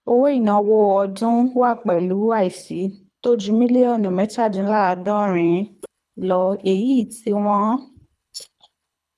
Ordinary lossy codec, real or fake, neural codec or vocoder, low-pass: none; fake; codec, 24 kHz, 3 kbps, HILCodec; none